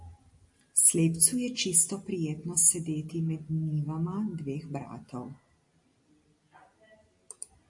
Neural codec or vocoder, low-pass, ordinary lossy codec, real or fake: none; 10.8 kHz; AAC, 64 kbps; real